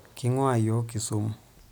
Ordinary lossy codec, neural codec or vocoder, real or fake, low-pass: none; none; real; none